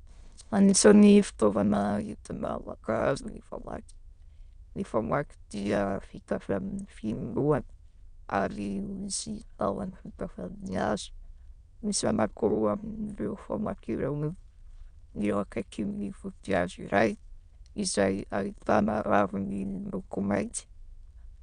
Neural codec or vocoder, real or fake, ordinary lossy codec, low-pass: autoencoder, 22.05 kHz, a latent of 192 numbers a frame, VITS, trained on many speakers; fake; Opus, 64 kbps; 9.9 kHz